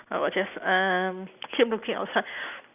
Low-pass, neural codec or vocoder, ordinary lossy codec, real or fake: 3.6 kHz; none; none; real